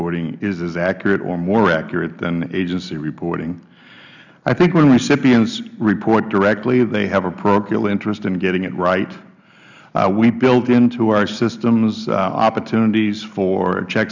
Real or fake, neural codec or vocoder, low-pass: real; none; 7.2 kHz